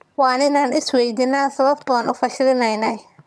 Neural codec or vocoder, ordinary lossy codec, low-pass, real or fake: vocoder, 22.05 kHz, 80 mel bands, HiFi-GAN; none; none; fake